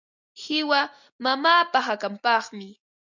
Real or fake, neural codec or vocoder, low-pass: real; none; 7.2 kHz